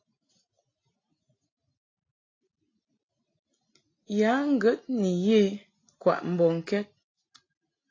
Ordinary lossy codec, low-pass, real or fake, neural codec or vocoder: AAC, 32 kbps; 7.2 kHz; real; none